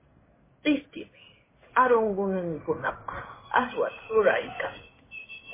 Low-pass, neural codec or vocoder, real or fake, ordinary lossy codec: 3.6 kHz; codec, 16 kHz in and 24 kHz out, 1 kbps, XY-Tokenizer; fake; MP3, 16 kbps